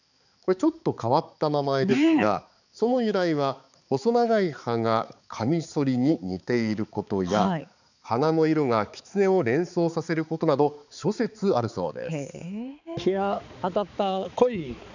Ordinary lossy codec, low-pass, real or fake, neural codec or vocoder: none; 7.2 kHz; fake; codec, 16 kHz, 4 kbps, X-Codec, HuBERT features, trained on balanced general audio